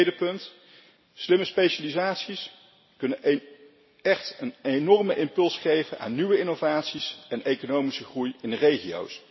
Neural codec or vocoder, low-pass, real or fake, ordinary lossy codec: none; 7.2 kHz; real; MP3, 24 kbps